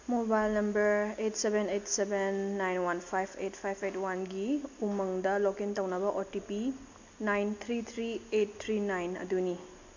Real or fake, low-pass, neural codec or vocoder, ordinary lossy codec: real; 7.2 kHz; none; MP3, 48 kbps